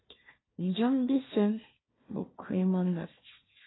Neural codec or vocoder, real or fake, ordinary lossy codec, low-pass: codec, 16 kHz, 1 kbps, FunCodec, trained on Chinese and English, 50 frames a second; fake; AAC, 16 kbps; 7.2 kHz